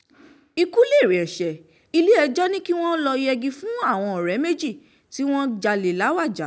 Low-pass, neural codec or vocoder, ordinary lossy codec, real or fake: none; none; none; real